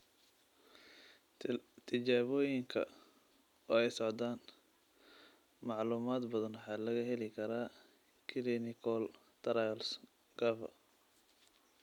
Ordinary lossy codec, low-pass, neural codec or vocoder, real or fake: none; 19.8 kHz; none; real